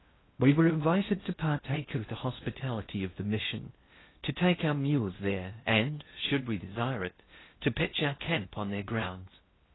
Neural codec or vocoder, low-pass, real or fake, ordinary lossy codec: codec, 16 kHz in and 24 kHz out, 0.6 kbps, FocalCodec, streaming, 2048 codes; 7.2 kHz; fake; AAC, 16 kbps